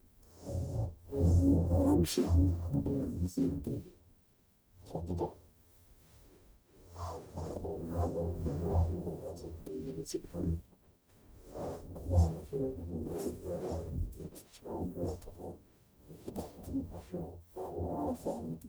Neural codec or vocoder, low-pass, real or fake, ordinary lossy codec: codec, 44.1 kHz, 0.9 kbps, DAC; none; fake; none